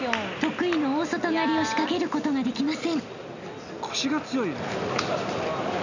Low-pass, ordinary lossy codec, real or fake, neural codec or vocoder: 7.2 kHz; none; real; none